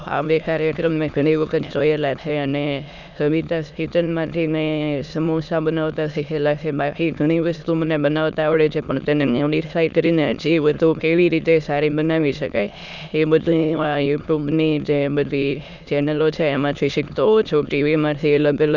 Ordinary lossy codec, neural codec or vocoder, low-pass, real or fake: none; autoencoder, 22.05 kHz, a latent of 192 numbers a frame, VITS, trained on many speakers; 7.2 kHz; fake